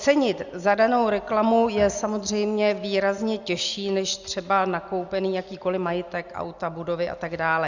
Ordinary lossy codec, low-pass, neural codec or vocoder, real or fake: Opus, 64 kbps; 7.2 kHz; none; real